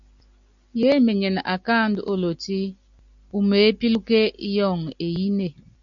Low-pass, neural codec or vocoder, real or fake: 7.2 kHz; none; real